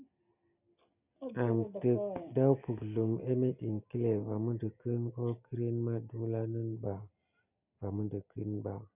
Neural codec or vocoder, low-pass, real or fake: none; 3.6 kHz; real